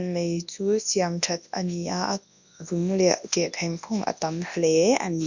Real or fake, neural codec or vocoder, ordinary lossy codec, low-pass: fake; codec, 24 kHz, 0.9 kbps, WavTokenizer, large speech release; none; 7.2 kHz